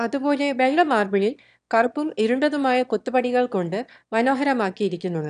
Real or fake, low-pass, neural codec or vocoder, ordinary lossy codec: fake; 9.9 kHz; autoencoder, 22.05 kHz, a latent of 192 numbers a frame, VITS, trained on one speaker; none